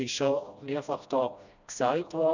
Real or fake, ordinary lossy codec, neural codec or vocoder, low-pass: fake; none; codec, 16 kHz, 1 kbps, FreqCodec, smaller model; 7.2 kHz